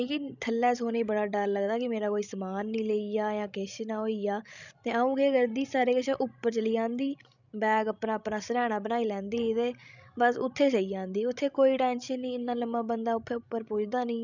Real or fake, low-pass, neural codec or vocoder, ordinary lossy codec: fake; 7.2 kHz; codec, 16 kHz, 16 kbps, FreqCodec, larger model; none